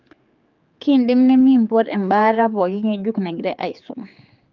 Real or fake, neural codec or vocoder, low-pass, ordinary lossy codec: fake; codec, 16 kHz, 4 kbps, X-Codec, HuBERT features, trained on general audio; 7.2 kHz; Opus, 24 kbps